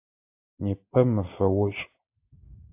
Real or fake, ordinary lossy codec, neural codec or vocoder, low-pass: real; AAC, 32 kbps; none; 3.6 kHz